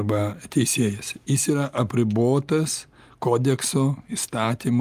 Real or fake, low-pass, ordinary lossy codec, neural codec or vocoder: real; 14.4 kHz; Opus, 32 kbps; none